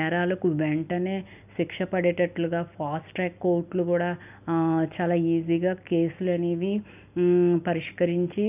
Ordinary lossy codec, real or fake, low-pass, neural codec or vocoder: none; real; 3.6 kHz; none